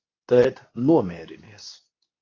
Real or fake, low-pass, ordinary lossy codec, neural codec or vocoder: fake; 7.2 kHz; AAC, 32 kbps; codec, 24 kHz, 0.9 kbps, WavTokenizer, medium speech release version 2